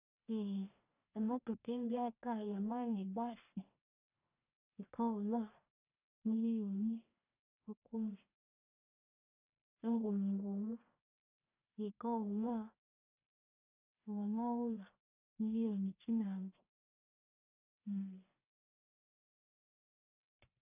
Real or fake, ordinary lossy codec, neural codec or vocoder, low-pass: fake; none; codec, 44.1 kHz, 1.7 kbps, Pupu-Codec; 3.6 kHz